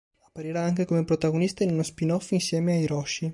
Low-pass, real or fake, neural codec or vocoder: 10.8 kHz; real; none